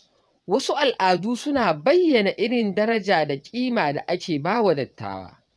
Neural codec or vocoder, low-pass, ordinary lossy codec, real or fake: vocoder, 22.05 kHz, 80 mel bands, WaveNeXt; none; none; fake